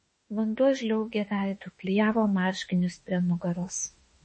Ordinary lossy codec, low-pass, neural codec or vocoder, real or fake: MP3, 32 kbps; 9.9 kHz; autoencoder, 48 kHz, 32 numbers a frame, DAC-VAE, trained on Japanese speech; fake